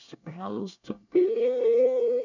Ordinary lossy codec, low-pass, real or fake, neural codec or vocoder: none; 7.2 kHz; fake; codec, 24 kHz, 1 kbps, SNAC